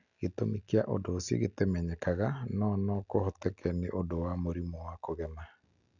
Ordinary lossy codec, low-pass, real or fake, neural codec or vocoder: none; 7.2 kHz; real; none